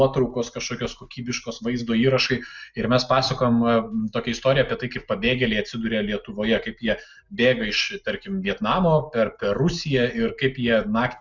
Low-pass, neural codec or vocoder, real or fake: 7.2 kHz; none; real